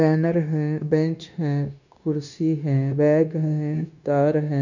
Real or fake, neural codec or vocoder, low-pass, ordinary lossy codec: fake; codec, 16 kHz, 0.9 kbps, LongCat-Audio-Codec; 7.2 kHz; none